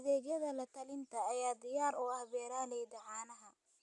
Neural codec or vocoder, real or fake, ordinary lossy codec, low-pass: none; real; none; 10.8 kHz